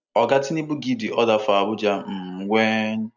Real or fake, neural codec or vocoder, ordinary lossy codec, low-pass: real; none; none; 7.2 kHz